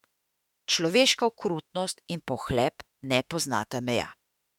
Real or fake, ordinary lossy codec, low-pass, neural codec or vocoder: fake; MP3, 96 kbps; 19.8 kHz; autoencoder, 48 kHz, 32 numbers a frame, DAC-VAE, trained on Japanese speech